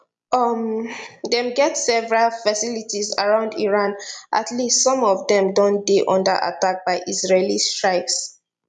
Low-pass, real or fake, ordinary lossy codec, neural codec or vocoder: 10.8 kHz; real; none; none